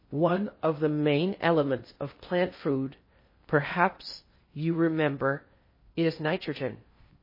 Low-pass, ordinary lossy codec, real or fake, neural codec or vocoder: 5.4 kHz; MP3, 24 kbps; fake; codec, 16 kHz in and 24 kHz out, 0.6 kbps, FocalCodec, streaming, 2048 codes